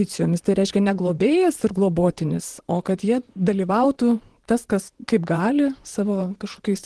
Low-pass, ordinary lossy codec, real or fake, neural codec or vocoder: 10.8 kHz; Opus, 16 kbps; fake; vocoder, 44.1 kHz, 128 mel bands, Pupu-Vocoder